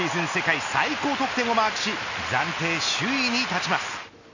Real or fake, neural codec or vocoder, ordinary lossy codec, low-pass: real; none; none; 7.2 kHz